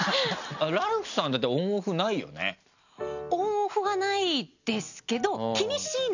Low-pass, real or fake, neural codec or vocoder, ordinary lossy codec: 7.2 kHz; real; none; none